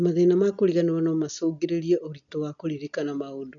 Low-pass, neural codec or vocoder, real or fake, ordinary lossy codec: 7.2 kHz; none; real; none